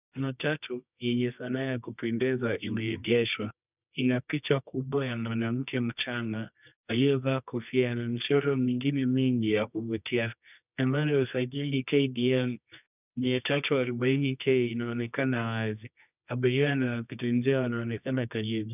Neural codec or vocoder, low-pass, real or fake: codec, 24 kHz, 0.9 kbps, WavTokenizer, medium music audio release; 3.6 kHz; fake